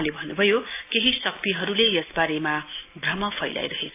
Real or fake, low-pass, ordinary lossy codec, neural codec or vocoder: real; 3.6 kHz; AAC, 24 kbps; none